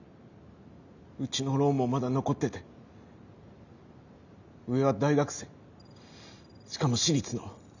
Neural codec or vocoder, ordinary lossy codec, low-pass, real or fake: none; none; 7.2 kHz; real